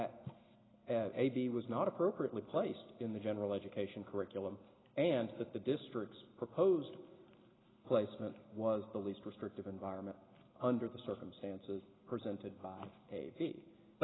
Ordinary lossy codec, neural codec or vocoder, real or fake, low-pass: AAC, 16 kbps; none; real; 7.2 kHz